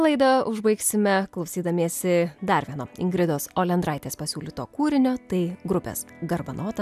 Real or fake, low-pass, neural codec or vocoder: real; 14.4 kHz; none